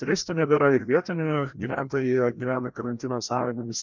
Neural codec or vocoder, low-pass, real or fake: codec, 16 kHz, 1 kbps, FreqCodec, larger model; 7.2 kHz; fake